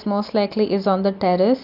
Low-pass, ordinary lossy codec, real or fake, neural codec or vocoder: 5.4 kHz; AAC, 48 kbps; real; none